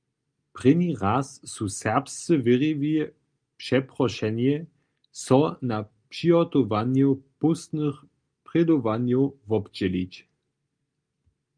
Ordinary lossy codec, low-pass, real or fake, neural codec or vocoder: Opus, 32 kbps; 9.9 kHz; real; none